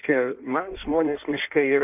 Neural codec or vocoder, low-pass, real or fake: codec, 16 kHz in and 24 kHz out, 1.1 kbps, FireRedTTS-2 codec; 3.6 kHz; fake